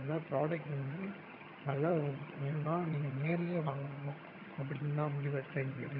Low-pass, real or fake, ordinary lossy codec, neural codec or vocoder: 5.4 kHz; fake; none; vocoder, 22.05 kHz, 80 mel bands, HiFi-GAN